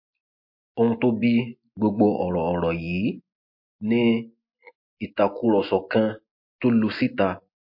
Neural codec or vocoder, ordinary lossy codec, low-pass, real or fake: none; MP3, 32 kbps; 5.4 kHz; real